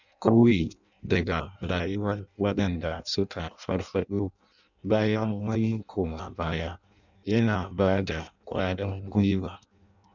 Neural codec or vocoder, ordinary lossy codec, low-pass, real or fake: codec, 16 kHz in and 24 kHz out, 0.6 kbps, FireRedTTS-2 codec; none; 7.2 kHz; fake